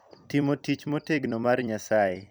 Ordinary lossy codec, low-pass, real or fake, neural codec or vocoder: none; none; fake; vocoder, 44.1 kHz, 128 mel bands every 256 samples, BigVGAN v2